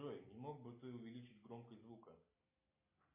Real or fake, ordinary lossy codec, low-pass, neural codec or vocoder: real; MP3, 24 kbps; 3.6 kHz; none